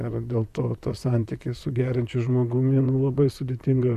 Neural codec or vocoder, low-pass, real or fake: vocoder, 44.1 kHz, 128 mel bands, Pupu-Vocoder; 14.4 kHz; fake